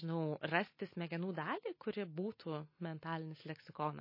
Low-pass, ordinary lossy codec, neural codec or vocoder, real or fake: 5.4 kHz; MP3, 24 kbps; none; real